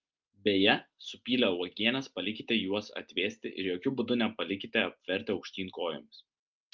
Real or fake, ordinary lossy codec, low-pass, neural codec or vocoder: real; Opus, 24 kbps; 7.2 kHz; none